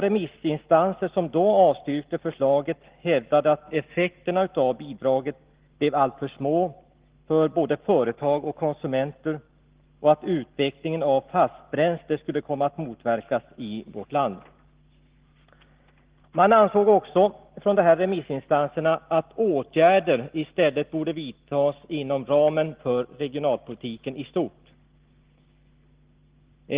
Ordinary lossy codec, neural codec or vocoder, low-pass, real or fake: Opus, 16 kbps; none; 3.6 kHz; real